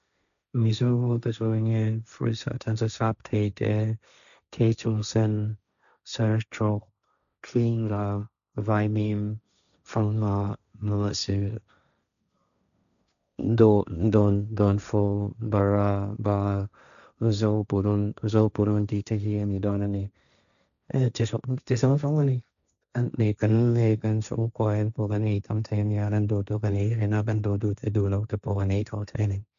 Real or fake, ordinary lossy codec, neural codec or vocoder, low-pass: fake; none; codec, 16 kHz, 1.1 kbps, Voila-Tokenizer; 7.2 kHz